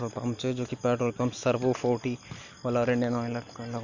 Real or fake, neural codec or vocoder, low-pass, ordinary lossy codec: real; none; 7.2 kHz; Opus, 64 kbps